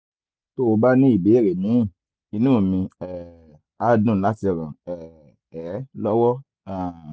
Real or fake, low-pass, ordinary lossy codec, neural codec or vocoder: real; none; none; none